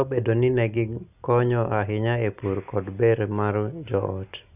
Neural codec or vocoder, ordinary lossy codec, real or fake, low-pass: none; none; real; 3.6 kHz